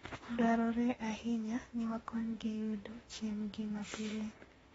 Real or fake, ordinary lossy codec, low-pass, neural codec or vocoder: fake; AAC, 24 kbps; 19.8 kHz; autoencoder, 48 kHz, 32 numbers a frame, DAC-VAE, trained on Japanese speech